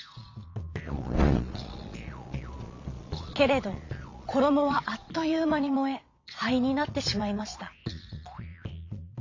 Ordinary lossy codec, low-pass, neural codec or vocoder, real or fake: AAC, 48 kbps; 7.2 kHz; vocoder, 22.05 kHz, 80 mel bands, Vocos; fake